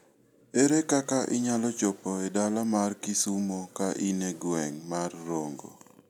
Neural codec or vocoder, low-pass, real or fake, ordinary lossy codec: none; 19.8 kHz; real; none